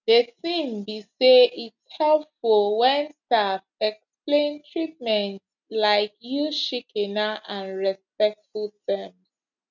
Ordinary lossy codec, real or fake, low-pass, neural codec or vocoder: none; real; 7.2 kHz; none